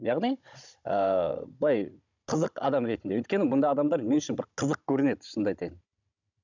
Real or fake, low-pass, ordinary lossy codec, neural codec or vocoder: fake; 7.2 kHz; none; codec, 16 kHz, 16 kbps, FunCodec, trained on Chinese and English, 50 frames a second